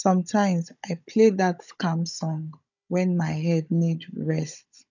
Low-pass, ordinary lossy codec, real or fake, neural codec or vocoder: 7.2 kHz; none; fake; codec, 16 kHz, 16 kbps, FunCodec, trained on Chinese and English, 50 frames a second